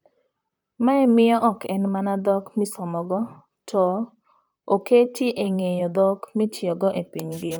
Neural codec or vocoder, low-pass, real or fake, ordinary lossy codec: vocoder, 44.1 kHz, 128 mel bands, Pupu-Vocoder; none; fake; none